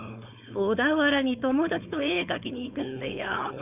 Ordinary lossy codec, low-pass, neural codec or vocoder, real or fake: none; 3.6 kHz; codec, 16 kHz, 4.8 kbps, FACodec; fake